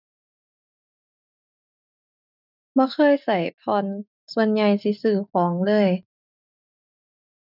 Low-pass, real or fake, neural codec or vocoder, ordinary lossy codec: 5.4 kHz; real; none; none